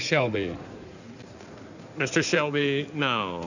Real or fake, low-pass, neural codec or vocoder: fake; 7.2 kHz; codec, 16 kHz in and 24 kHz out, 2.2 kbps, FireRedTTS-2 codec